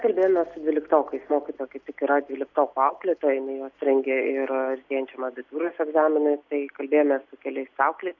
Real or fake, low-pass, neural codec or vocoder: real; 7.2 kHz; none